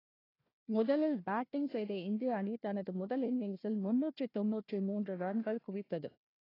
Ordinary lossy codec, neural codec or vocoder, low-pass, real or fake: AAC, 24 kbps; codec, 16 kHz, 1 kbps, FunCodec, trained on Chinese and English, 50 frames a second; 5.4 kHz; fake